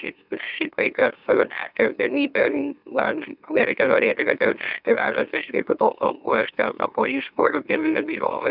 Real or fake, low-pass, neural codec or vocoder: fake; 5.4 kHz; autoencoder, 44.1 kHz, a latent of 192 numbers a frame, MeloTTS